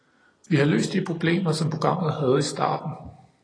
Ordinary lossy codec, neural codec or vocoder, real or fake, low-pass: AAC, 32 kbps; none; real; 9.9 kHz